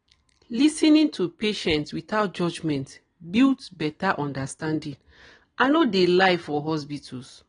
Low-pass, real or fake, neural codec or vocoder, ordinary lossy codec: 9.9 kHz; real; none; AAC, 32 kbps